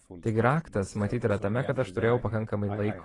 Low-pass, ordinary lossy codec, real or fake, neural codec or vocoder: 10.8 kHz; AAC, 32 kbps; fake; vocoder, 24 kHz, 100 mel bands, Vocos